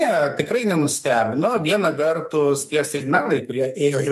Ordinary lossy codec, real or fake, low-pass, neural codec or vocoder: MP3, 64 kbps; fake; 14.4 kHz; codec, 44.1 kHz, 2.6 kbps, SNAC